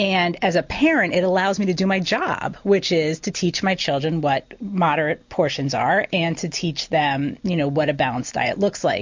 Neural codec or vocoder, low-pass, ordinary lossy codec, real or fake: none; 7.2 kHz; MP3, 48 kbps; real